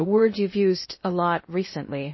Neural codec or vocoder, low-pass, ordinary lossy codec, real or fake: codec, 16 kHz in and 24 kHz out, 0.6 kbps, FocalCodec, streaming, 2048 codes; 7.2 kHz; MP3, 24 kbps; fake